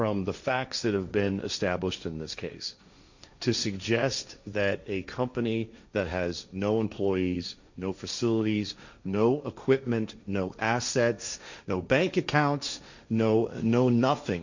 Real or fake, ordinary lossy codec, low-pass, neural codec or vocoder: fake; Opus, 64 kbps; 7.2 kHz; codec, 16 kHz, 1.1 kbps, Voila-Tokenizer